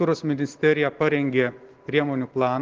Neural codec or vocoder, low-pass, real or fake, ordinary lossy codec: none; 7.2 kHz; real; Opus, 16 kbps